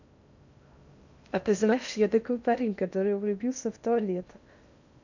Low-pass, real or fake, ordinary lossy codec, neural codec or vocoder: 7.2 kHz; fake; none; codec, 16 kHz in and 24 kHz out, 0.6 kbps, FocalCodec, streaming, 2048 codes